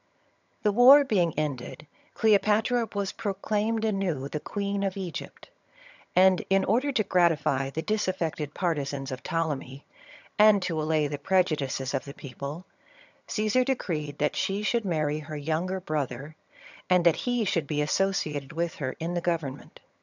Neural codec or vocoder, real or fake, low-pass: vocoder, 22.05 kHz, 80 mel bands, HiFi-GAN; fake; 7.2 kHz